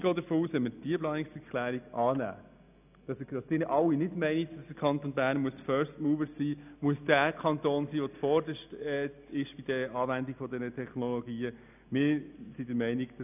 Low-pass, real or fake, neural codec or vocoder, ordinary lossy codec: 3.6 kHz; real; none; none